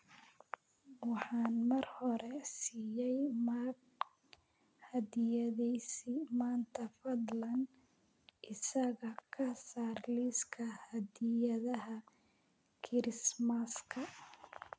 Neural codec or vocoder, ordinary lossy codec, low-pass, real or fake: none; none; none; real